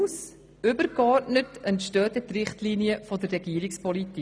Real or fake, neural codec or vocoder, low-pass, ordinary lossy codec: real; none; none; none